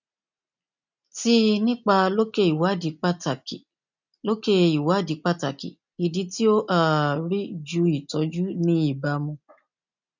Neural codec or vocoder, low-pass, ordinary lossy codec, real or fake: none; 7.2 kHz; none; real